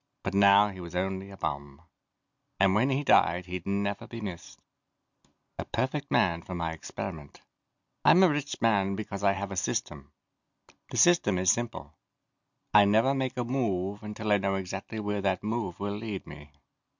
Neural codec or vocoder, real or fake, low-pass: none; real; 7.2 kHz